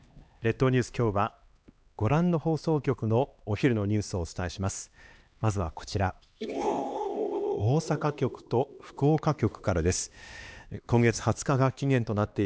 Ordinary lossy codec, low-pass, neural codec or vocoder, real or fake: none; none; codec, 16 kHz, 2 kbps, X-Codec, HuBERT features, trained on LibriSpeech; fake